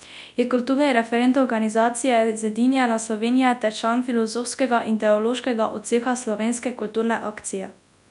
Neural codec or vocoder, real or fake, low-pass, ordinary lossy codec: codec, 24 kHz, 0.9 kbps, WavTokenizer, large speech release; fake; 10.8 kHz; none